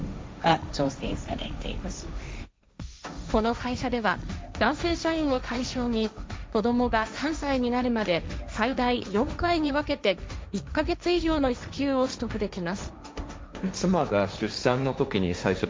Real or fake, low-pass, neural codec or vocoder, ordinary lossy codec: fake; none; codec, 16 kHz, 1.1 kbps, Voila-Tokenizer; none